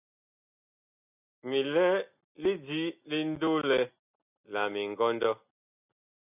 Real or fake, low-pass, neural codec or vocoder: real; 3.6 kHz; none